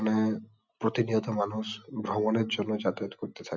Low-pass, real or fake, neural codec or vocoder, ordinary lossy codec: 7.2 kHz; real; none; none